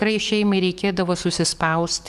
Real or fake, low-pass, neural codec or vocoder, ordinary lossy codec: fake; 14.4 kHz; codec, 44.1 kHz, 7.8 kbps, DAC; Opus, 64 kbps